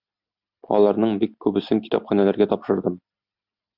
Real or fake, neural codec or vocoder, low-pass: real; none; 5.4 kHz